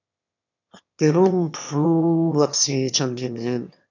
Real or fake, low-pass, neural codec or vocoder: fake; 7.2 kHz; autoencoder, 22.05 kHz, a latent of 192 numbers a frame, VITS, trained on one speaker